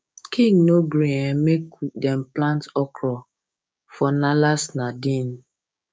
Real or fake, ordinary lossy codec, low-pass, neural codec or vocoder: fake; none; none; codec, 16 kHz, 6 kbps, DAC